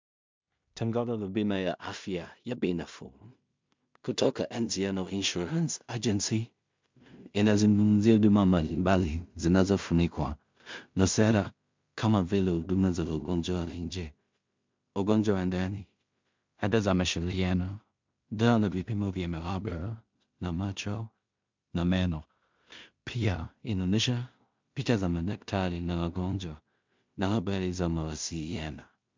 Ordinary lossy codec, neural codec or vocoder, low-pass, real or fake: MP3, 64 kbps; codec, 16 kHz in and 24 kHz out, 0.4 kbps, LongCat-Audio-Codec, two codebook decoder; 7.2 kHz; fake